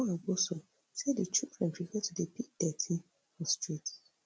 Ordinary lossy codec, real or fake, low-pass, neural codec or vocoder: none; real; none; none